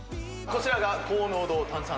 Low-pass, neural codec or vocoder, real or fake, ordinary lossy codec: none; none; real; none